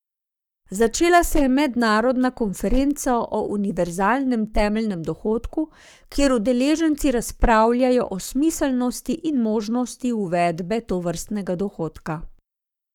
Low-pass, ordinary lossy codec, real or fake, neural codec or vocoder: 19.8 kHz; none; fake; codec, 44.1 kHz, 7.8 kbps, Pupu-Codec